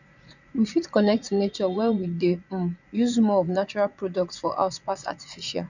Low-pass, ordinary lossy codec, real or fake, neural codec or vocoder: 7.2 kHz; none; fake; vocoder, 44.1 kHz, 80 mel bands, Vocos